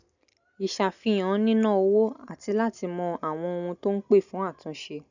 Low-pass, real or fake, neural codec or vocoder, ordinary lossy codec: 7.2 kHz; real; none; none